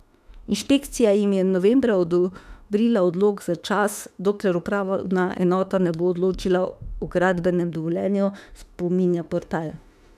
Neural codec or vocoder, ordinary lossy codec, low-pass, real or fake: autoencoder, 48 kHz, 32 numbers a frame, DAC-VAE, trained on Japanese speech; none; 14.4 kHz; fake